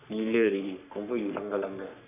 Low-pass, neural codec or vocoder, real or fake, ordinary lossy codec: 3.6 kHz; codec, 44.1 kHz, 3.4 kbps, Pupu-Codec; fake; none